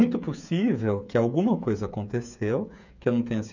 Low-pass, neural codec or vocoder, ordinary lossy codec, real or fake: 7.2 kHz; codec, 16 kHz, 8 kbps, FreqCodec, smaller model; none; fake